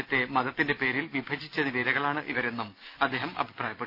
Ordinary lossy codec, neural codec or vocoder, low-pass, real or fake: none; none; 5.4 kHz; real